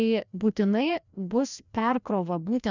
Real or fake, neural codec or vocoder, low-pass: fake; codec, 16 kHz, 1 kbps, FreqCodec, larger model; 7.2 kHz